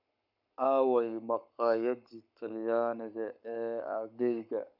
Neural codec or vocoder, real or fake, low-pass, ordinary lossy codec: codec, 44.1 kHz, 7.8 kbps, Pupu-Codec; fake; 5.4 kHz; none